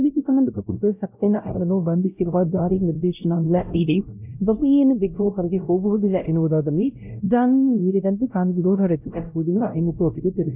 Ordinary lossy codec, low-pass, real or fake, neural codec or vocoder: none; 3.6 kHz; fake; codec, 16 kHz, 0.5 kbps, X-Codec, WavLM features, trained on Multilingual LibriSpeech